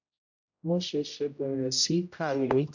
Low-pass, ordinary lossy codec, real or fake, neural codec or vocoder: 7.2 kHz; none; fake; codec, 16 kHz, 0.5 kbps, X-Codec, HuBERT features, trained on general audio